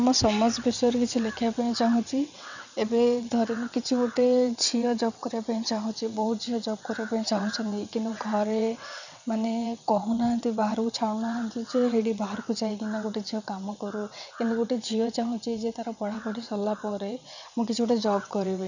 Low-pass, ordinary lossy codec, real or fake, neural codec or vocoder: 7.2 kHz; none; fake; vocoder, 22.05 kHz, 80 mel bands, WaveNeXt